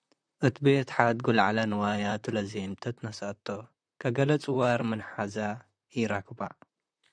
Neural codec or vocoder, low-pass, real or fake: vocoder, 44.1 kHz, 128 mel bands, Pupu-Vocoder; 9.9 kHz; fake